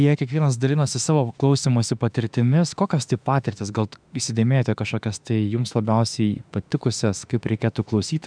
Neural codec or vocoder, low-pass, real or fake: autoencoder, 48 kHz, 32 numbers a frame, DAC-VAE, trained on Japanese speech; 9.9 kHz; fake